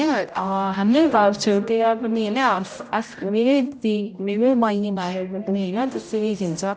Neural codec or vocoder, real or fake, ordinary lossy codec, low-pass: codec, 16 kHz, 0.5 kbps, X-Codec, HuBERT features, trained on general audio; fake; none; none